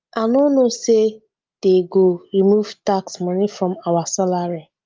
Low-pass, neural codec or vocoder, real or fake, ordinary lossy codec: 7.2 kHz; none; real; Opus, 24 kbps